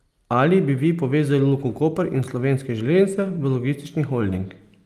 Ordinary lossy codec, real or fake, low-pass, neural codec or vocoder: Opus, 32 kbps; real; 14.4 kHz; none